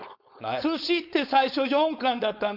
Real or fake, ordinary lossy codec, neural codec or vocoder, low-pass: fake; none; codec, 16 kHz, 4.8 kbps, FACodec; 5.4 kHz